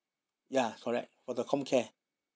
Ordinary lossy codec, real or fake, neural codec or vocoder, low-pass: none; real; none; none